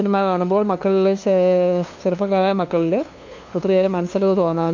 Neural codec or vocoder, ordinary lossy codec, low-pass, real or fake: codec, 16 kHz, 2 kbps, FunCodec, trained on LibriTTS, 25 frames a second; MP3, 48 kbps; 7.2 kHz; fake